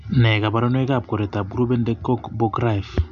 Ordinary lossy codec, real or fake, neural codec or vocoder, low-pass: none; real; none; 7.2 kHz